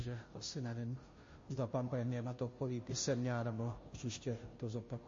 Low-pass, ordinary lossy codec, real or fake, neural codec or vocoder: 7.2 kHz; MP3, 32 kbps; fake; codec, 16 kHz, 0.5 kbps, FunCodec, trained on Chinese and English, 25 frames a second